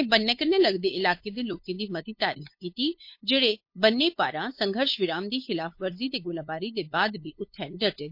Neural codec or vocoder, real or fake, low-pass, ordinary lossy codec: codec, 16 kHz, 8 kbps, FunCodec, trained on LibriTTS, 25 frames a second; fake; 5.4 kHz; MP3, 32 kbps